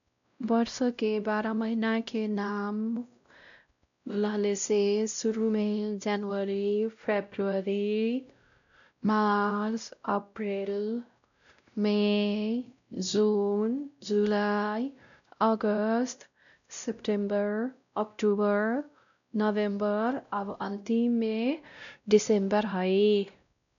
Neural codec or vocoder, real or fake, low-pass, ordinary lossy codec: codec, 16 kHz, 0.5 kbps, X-Codec, WavLM features, trained on Multilingual LibriSpeech; fake; 7.2 kHz; none